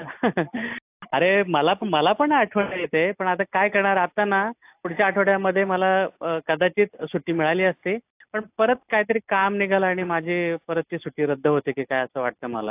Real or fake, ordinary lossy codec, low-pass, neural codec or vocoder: real; AAC, 32 kbps; 3.6 kHz; none